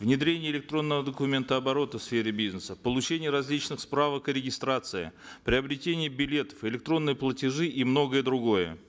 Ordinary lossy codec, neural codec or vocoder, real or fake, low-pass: none; none; real; none